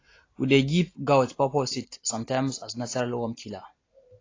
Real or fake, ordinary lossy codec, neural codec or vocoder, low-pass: real; AAC, 32 kbps; none; 7.2 kHz